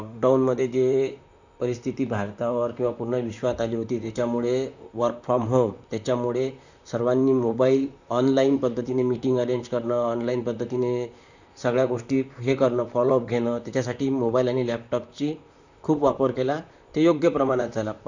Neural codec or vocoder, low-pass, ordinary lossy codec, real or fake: vocoder, 44.1 kHz, 128 mel bands, Pupu-Vocoder; 7.2 kHz; none; fake